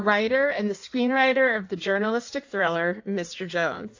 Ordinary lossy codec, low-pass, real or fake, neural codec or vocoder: AAC, 48 kbps; 7.2 kHz; fake; codec, 16 kHz in and 24 kHz out, 1.1 kbps, FireRedTTS-2 codec